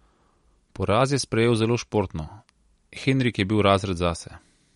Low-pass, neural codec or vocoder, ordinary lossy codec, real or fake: 19.8 kHz; none; MP3, 48 kbps; real